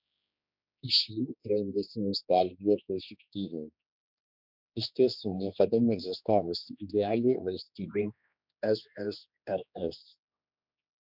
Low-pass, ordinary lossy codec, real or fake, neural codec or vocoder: 5.4 kHz; MP3, 48 kbps; fake; codec, 16 kHz, 2 kbps, X-Codec, HuBERT features, trained on general audio